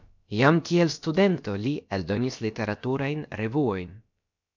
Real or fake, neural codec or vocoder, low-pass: fake; codec, 16 kHz, about 1 kbps, DyCAST, with the encoder's durations; 7.2 kHz